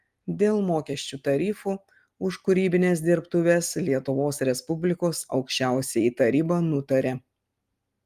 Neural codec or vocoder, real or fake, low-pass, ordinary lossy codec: none; real; 14.4 kHz; Opus, 32 kbps